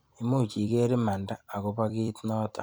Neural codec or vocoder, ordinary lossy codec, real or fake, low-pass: vocoder, 44.1 kHz, 128 mel bands every 256 samples, BigVGAN v2; none; fake; none